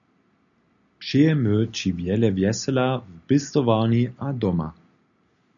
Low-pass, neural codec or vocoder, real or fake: 7.2 kHz; none; real